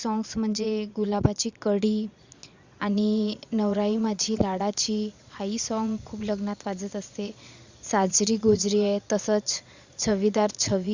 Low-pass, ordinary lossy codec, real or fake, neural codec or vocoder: 7.2 kHz; Opus, 64 kbps; fake; vocoder, 22.05 kHz, 80 mel bands, Vocos